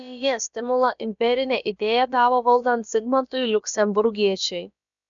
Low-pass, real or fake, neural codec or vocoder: 7.2 kHz; fake; codec, 16 kHz, about 1 kbps, DyCAST, with the encoder's durations